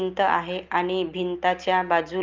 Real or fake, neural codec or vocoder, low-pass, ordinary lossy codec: real; none; 7.2 kHz; Opus, 24 kbps